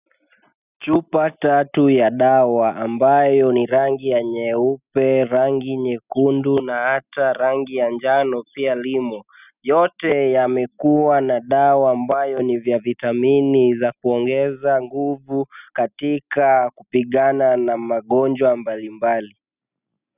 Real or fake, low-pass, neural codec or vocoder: real; 3.6 kHz; none